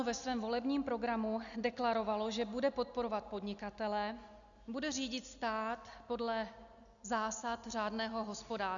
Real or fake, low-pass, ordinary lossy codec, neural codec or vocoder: real; 7.2 kHz; MP3, 96 kbps; none